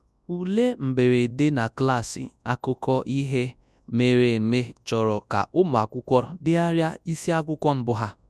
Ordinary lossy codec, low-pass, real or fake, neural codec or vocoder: none; none; fake; codec, 24 kHz, 0.9 kbps, WavTokenizer, large speech release